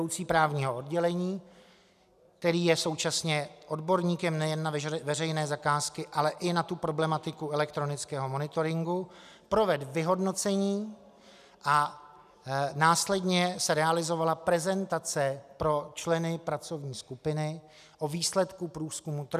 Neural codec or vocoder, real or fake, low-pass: none; real; 14.4 kHz